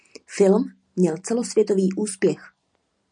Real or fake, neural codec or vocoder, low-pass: real; none; 9.9 kHz